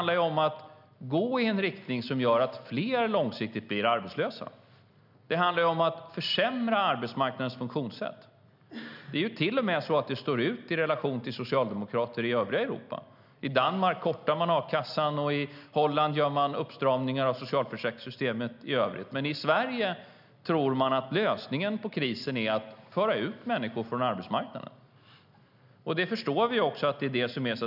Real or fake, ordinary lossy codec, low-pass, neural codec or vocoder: real; none; 5.4 kHz; none